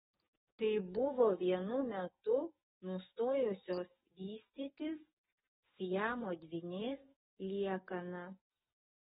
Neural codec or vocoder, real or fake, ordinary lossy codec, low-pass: codec, 44.1 kHz, 7.8 kbps, DAC; fake; AAC, 16 kbps; 19.8 kHz